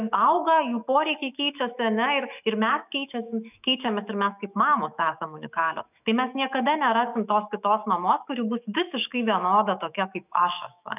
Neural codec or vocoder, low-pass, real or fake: autoencoder, 48 kHz, 128 numbers a frame, DAC-VAE, trained on Japanese speech; 3.6 kHz; fake